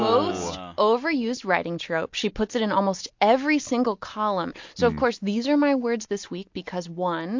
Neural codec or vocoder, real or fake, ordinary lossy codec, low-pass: none; real; MP3, 48 kbps; 7.2 kHz